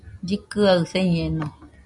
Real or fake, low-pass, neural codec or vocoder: real; 10.8 kHz; none